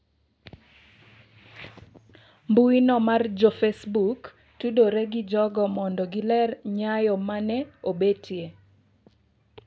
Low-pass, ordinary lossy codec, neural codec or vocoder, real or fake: none; none; none; real